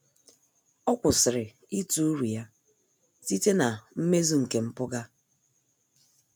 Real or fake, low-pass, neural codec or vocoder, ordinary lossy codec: real; none; none; none